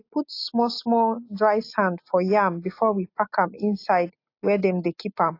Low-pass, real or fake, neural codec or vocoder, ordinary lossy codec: 5.4 kHz; real; none; AAC, 32 kbps